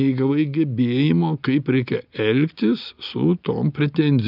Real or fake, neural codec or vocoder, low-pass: real; none; 5.4 kHz